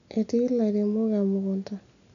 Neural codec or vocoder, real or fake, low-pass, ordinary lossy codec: none; real; 7.2 kHz; none